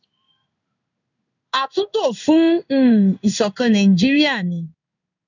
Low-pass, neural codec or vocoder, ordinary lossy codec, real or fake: 7.2 kHz; codec, 16 kHz in and 24 kHz out, 1 kbps, XY-Tokenizer; none; fake